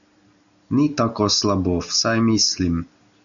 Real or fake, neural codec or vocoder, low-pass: real; none; 7.2 kHz